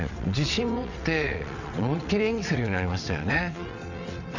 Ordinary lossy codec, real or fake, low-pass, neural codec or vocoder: none; fake; 7.2 kHz; vocoder, 22.05 kHz, 80 mel bands, WaveNeXt